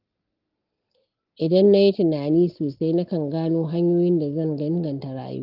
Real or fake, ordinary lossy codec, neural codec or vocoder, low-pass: real; Opus, 32 kbps; none; 5.4 kHz